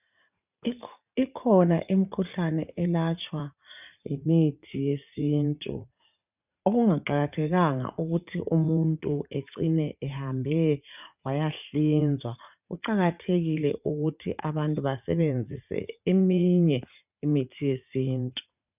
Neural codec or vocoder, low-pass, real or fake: vocoder, 44.1 kHz, 80 mel bands, Vocos; 3.6 kHz; fake